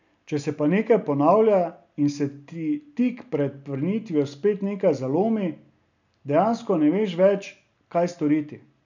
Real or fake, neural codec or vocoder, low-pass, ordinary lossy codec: real; none; 7.2 kHz; none